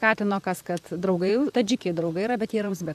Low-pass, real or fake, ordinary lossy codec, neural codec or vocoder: 14.4 kHz; fake; AAC, 96 kbps; vocoder, 44.1 kHz, 128 mel bands, Pupu-Vocoder